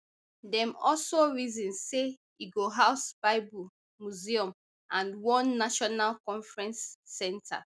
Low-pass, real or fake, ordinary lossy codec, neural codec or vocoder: none; real; none; none